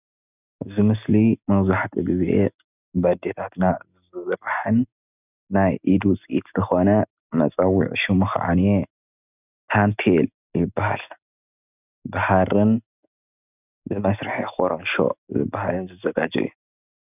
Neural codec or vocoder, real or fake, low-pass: none; real; 3.6 kHz